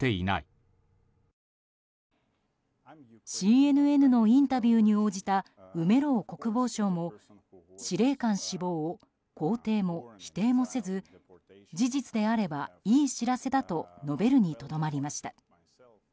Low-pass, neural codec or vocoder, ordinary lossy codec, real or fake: none; none; none; real